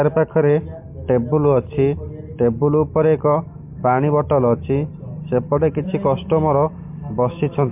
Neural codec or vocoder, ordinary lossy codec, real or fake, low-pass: none; MP3, 32 kbps; real; 3.6 kHz